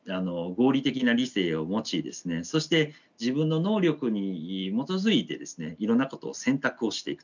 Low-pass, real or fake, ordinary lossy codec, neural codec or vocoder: 7.2 kHz; real; none; none